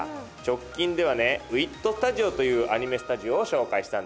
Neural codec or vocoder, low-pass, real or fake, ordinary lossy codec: none; none; real; none